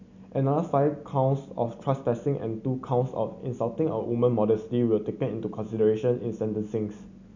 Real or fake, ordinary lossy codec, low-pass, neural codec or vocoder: real; none; 7.2 kHz; none